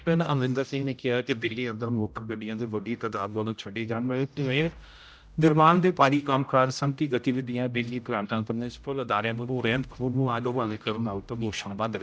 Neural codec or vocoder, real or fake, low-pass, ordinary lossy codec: codec, 16 kHz, 0.5 kbps, X-Codec, HuBERT features, trained on general audio; fake; none; none